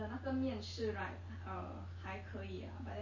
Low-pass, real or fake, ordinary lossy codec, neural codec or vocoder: 7.2 kHz; real; MP3, 32 kbps; none